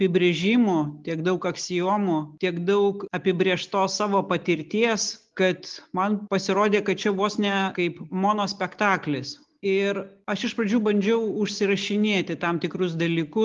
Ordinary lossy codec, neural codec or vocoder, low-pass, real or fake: Opus, 32 kbps; none; 7.2 kHz; real